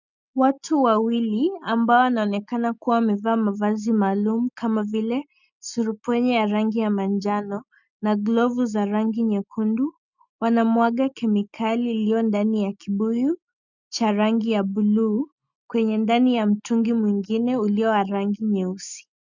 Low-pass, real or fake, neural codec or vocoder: 7.2 kHz; real; none